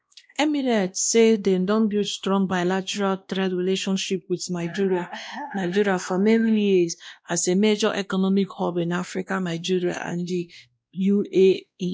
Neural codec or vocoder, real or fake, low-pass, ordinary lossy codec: codec, 16 kHz, 1 kbps, X-Codec, WavLM features, trained on Multilingual LibriSpeech; fake; none; none